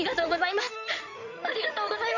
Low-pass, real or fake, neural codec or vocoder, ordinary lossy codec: 7.2 kHz; fake; codec, 16 kHz in and 24 kHz out, 2.2 kbps, FireRedTTS-2 codec; none